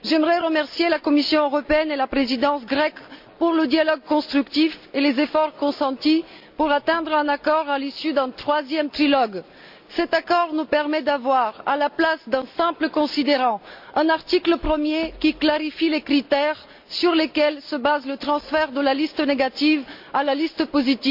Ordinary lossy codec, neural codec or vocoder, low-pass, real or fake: MP3, 48 kbps; none; 5.4 kHz; real